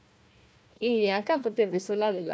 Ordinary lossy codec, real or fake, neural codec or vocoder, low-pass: none; fake; codec, 16 kHz, 1 kbps, FunCodec, trained on Chinese and English, 50 frames a second; none